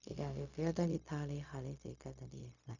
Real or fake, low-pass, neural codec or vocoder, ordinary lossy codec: fake; 7.2 kHz; codec, 16 kHz, 0.4 kbps, LongCat-Audio-Codec; none